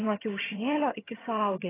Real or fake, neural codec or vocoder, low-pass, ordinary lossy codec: fake; vocoder, 22.05 kHz, 80 mel bands, HiFi-GAN; 3.6 kHz; AAC, 16 kbps